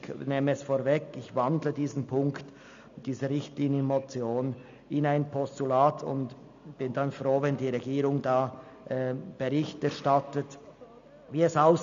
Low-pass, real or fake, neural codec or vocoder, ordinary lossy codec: 7.2 kHz; real; none; MP3, 64 kbps